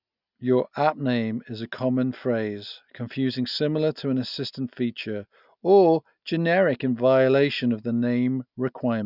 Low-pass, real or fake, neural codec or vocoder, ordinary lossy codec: 5.4 kHz; real; none; none